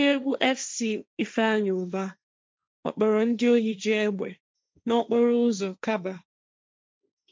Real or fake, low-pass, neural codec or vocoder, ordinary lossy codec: fake; none; codec, 16 kHz, 1.1 kbps, Voila-Tokenizer; none